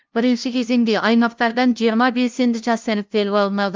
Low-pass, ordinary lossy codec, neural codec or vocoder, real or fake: 7.2 kHz; Opus, 24 kbps; codec, 16 kHz, 0.5 kbps, FunCodec, trained on LibriTTS, 25 frames a second; fake